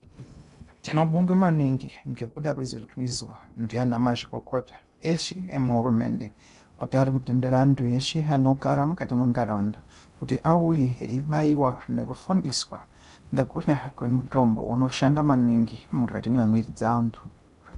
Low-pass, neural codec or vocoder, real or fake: 10.8 kHz; codec, 16 kHz in and 24 kHz out, 0.6 kbps, FocalCodec, streaming, 2048 codes; fake